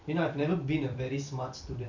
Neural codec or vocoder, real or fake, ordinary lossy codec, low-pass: vocoder, 44.1 kHz, 128 mel bands every 256 samples, BigVGAN v2; fake; none; 7.2 kHz